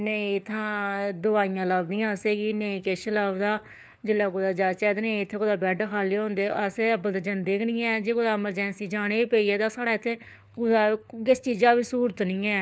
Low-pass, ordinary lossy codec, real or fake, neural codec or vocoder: none; none; fake; codec, 16 kHz, 4 kbps, FunCodec, trained on LibriTTS, 50 frames a second